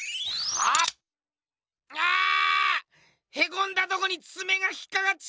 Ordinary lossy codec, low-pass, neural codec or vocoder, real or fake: none; none; none; real